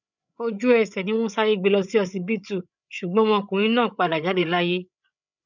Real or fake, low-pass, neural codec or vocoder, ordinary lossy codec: fake; 7.2 kHz; codec, 16 kHz, 8 kbps, FreqCodec, larger model; none